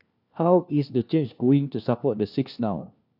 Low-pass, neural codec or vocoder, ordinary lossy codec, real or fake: 5.4 kHz; codec, 16 kHz, 1 kbps, FunCodec, trained on LibriTTS, 50 frames a second; none; fake